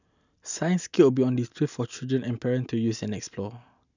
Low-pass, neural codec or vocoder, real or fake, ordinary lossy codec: 7.2 kHz; none; real; none